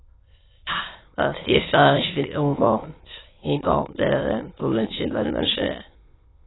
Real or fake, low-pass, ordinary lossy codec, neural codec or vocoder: fake; 7.2 kHz; AAC, 16 kbps; autoencoder, 22.05 kHz, a latent of 192 numbers a frame, VITS, trained on many speakers